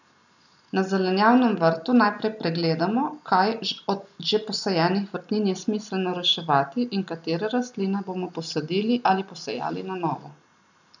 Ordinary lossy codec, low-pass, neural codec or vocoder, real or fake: none; 7.2 kHz; none; real